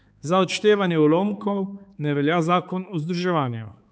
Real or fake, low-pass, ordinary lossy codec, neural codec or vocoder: fake; none; none; codec, 16 kHz, 4 kbps, X-Codec, HuBERT features, trained on balanced general audio